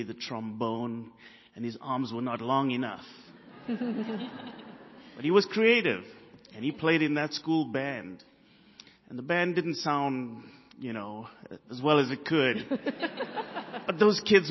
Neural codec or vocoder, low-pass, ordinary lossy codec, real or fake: none; 7.2 kHz; MP3, 24 kbps; real